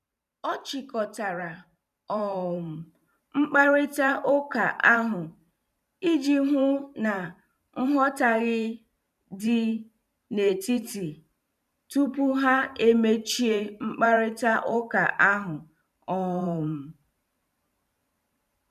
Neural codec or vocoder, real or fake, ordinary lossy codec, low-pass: vocoder, 44.1 kHz, 128 mel bands every 512 samples, BigVGAN v2; fake; none; 14.4 kHz